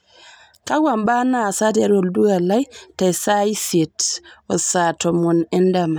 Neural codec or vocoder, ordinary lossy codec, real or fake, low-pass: none; none; real; none